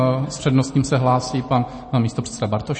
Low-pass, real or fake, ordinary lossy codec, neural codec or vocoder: 9.9 kHz; real; MP3, 32 kbps; none